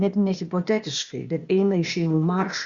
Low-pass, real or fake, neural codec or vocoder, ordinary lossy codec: 7.2 kHz; fake; codec, 16 kHz, 0.8 kbps, ZipCodec; Opus, 64 kbps